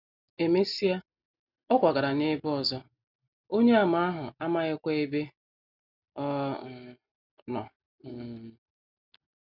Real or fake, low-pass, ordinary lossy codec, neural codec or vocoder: real; 5.4 kHz; none; none